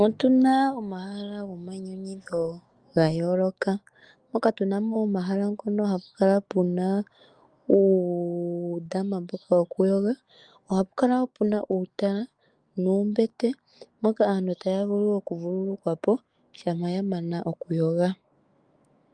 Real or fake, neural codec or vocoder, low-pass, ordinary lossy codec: fake; autoencoder, 48 kHz, 128 numbers a frame, DAC-VAE, trained on Japanese speech; 9.9 kHz; Opus, 32 kbps